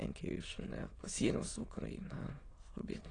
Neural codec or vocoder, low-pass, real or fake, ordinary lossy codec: autoencoder, 22.05 kHz, a latent of 192 numbers a frame, VITS, trained on many speakers; 9.9 kHz; fake; AAC, 32 kbps